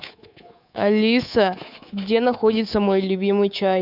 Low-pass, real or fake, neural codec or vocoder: 5.4 kHz; fake; codec, 24 kHz, 3.1 kbps, DualCodec